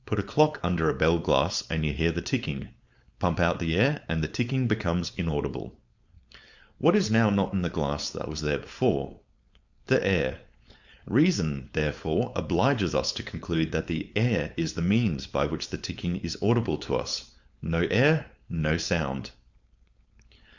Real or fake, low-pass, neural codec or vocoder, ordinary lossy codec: fake; 7.2 kHz; codec, 16 kHz, 4.8 kbps, FACodec; Opus, 64 kbps